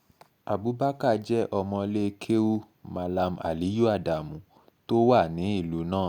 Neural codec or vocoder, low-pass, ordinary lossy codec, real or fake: none; 19.8 kHz; Opus, 64 kbps; real